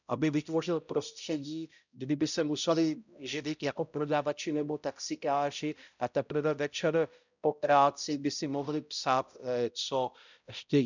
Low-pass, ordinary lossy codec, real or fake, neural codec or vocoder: 7.2 kHz; none; fake; codec, 16 kHz, 0.5 kbps, X-Codec, HuBERT features, trained on balanced general audio